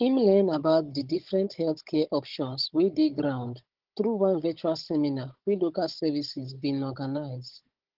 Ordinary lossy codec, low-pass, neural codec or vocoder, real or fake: Opus, 16 kbps; 5.4 kHz; codec, 16 kHz, 16 kbps, FunCodec, trained on Chinese and English, 50 frames a second; fake